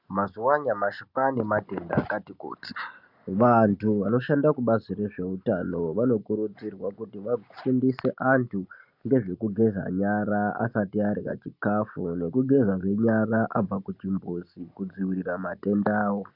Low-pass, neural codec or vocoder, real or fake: 5.4 kHz; none; real